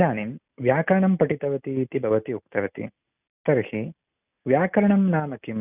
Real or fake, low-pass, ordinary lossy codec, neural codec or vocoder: real; 3.6 kHz; none; none